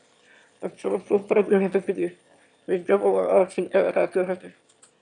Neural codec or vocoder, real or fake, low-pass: autoencoder, 22.05 kHz, a latent of 192 numbers a frame, VITS, trained on one speaker; fake; 9.9 kHz